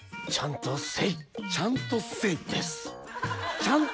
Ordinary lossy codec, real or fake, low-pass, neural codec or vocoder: none; real; none; none